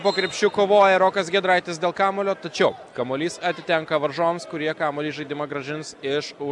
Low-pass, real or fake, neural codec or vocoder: 10.8 kHz; real; none